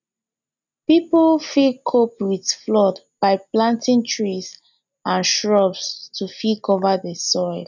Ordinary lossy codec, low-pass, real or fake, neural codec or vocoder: none; 7.2 kHz; real; none